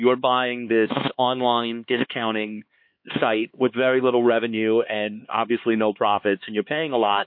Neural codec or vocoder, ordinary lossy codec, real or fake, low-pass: codec, 16 kHz, 2 kbps, X-Codec, HuBERT features, trained on LibriSpeech; MP3, 32 kbps; fake; 5.4 kHz